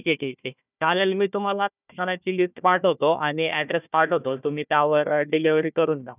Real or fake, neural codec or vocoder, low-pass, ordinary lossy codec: fake; codec, 16 kHz, 1 kbps, FunCodec, trained on Chinese and English, 50 frames a second; 3.6 kHz; none